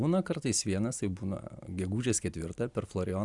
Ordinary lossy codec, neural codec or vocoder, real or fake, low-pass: Opus, 64 kbps; none; real; 10.8 kHz